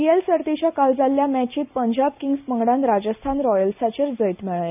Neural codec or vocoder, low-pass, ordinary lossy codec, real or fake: none; 3.6 kHz; none; real